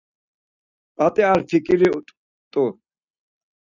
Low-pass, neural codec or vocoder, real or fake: 7.2 kHz; none; real